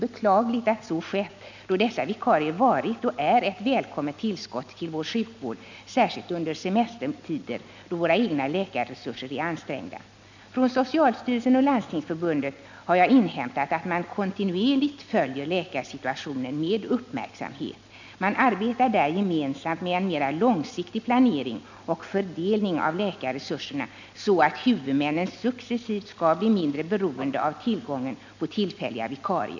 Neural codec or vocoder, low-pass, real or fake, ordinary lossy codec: none; 7.2 kHz; real; none